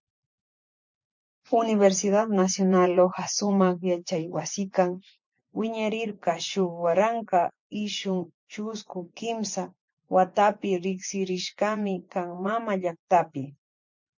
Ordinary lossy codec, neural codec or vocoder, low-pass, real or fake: MP3, 64 kbps; vocoder, 24 kHz, 100 mel bands, Vocos; 7.2 kHz; fake